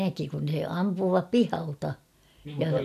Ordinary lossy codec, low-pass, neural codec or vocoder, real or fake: none; 14.4 kHz; none; real